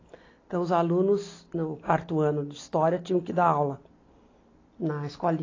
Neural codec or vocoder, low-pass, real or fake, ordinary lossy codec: none; 7.2 kHz; real; AAC, 32 kbps